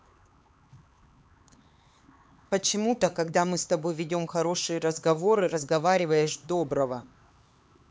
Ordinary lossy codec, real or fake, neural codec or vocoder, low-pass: none; fake; codec, 16 kHz, 4 kbps, X-Codec, HuBERT features, trained on LibriSpeech; none